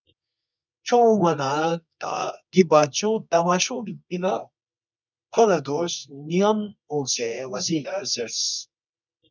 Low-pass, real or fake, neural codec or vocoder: 7.2 kHz; fake; codec, 24 kHz, 0.9 kbps, WavTokenizer, medium music audio release